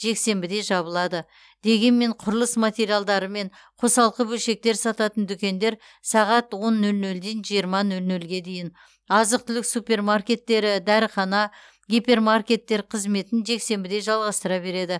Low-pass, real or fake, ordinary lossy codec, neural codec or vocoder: none; real; none; none